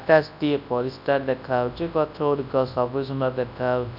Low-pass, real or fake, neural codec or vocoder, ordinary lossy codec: 5.4 kHz; fake; codec, 24 kHz, 0.9 kbps, WavTokenizer, large speech release; none